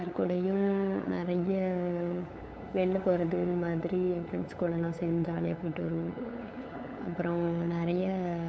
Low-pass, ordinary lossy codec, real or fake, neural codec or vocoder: none; none; fake; codec, 16 kHz, 8 kbps, FunCodec, trained on LibriTTS, 25 frames a second